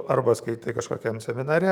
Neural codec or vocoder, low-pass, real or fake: codec, 44.1 kHz, 7.8 kbps, DAC; 19.8 kHz; fake